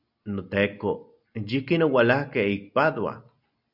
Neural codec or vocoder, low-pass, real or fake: none; 5.4 kHz; real